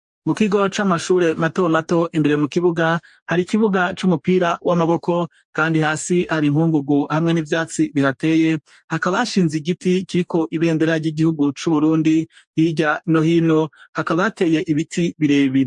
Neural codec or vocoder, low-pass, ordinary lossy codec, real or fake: codec, 44.1 kHz, 2.6 kbps, DAC; 10.8 kHz; MP3, 64 kbps; fake